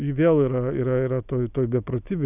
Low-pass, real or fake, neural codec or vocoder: 3.6 kHz; real; none